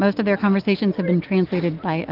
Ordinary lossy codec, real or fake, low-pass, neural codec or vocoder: Opus, 32 kbps; real; 5.4 kHz; none